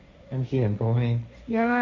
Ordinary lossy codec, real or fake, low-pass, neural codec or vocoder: none; fake; none; codec, 16 kHz, 1.1 kbps, Voila-Tokenizer